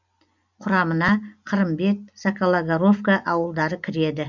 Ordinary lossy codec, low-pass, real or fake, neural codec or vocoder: none; 7.2 kHz; real; none